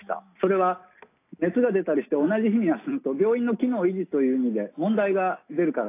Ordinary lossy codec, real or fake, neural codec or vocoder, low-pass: AAC, 24 kbps; real; none; 3.6 kHz